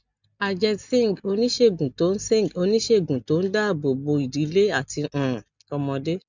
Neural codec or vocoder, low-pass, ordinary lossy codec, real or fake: none; 7.2 kHz; none; real